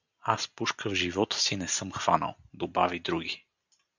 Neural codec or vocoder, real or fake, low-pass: none; real; 7.2 kHz